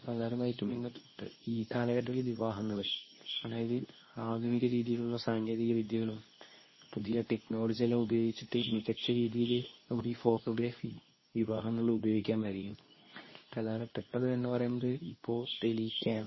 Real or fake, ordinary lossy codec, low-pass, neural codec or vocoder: fake; MP3, 24 kbps; 7.2 kHz; codec, 24 kHz, 0.9 kbps, WavTokenizer, medium speech release version 2